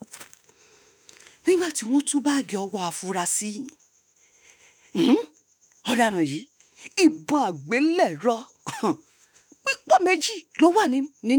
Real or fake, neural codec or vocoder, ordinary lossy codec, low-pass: fake; autoencoder, 48 kHz, 32 numbers a frame, DAC-VAE, trained on Japanese speech; none; none